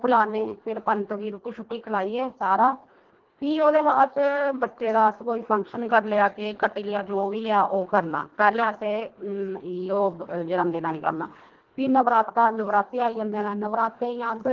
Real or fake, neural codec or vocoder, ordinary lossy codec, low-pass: fake; codec, 24 kHz, 1.5 kbps, HILCodec; Opus, 16 kbps; 7.2 kHz